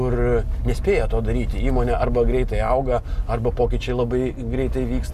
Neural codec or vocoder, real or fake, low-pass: none; real; 14.4 kHz